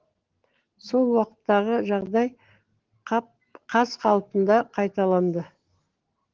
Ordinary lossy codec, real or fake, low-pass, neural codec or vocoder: Opus, 16 kbps; real; 7.2 kHz; none